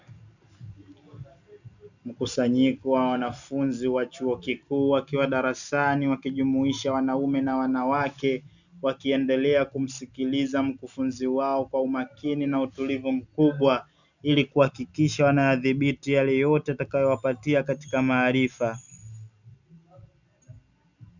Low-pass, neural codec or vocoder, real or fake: 7.2 kHz; none; real